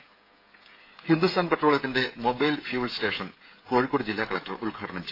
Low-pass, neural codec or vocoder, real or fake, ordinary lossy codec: 5.4 kHz; codec, 16 kHz, 16 kbps, FreqCodec, smaller model; fake; AAC, 32 kbps